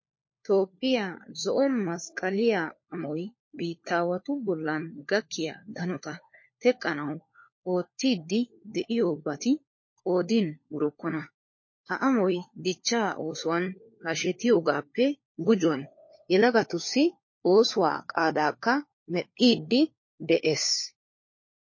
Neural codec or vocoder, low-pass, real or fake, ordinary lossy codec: codec, 16 kHz, 4 kbps, FunCodec, trained on LibriTTS, 50 frames a second; 7.2 kHz; fake; MP3, 32 kbps